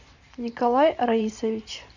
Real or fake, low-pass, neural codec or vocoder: fake; 7.2 kHz; vocoder, 44.1 kHz, 128 mel bands every 512 samples, BigVGAN v2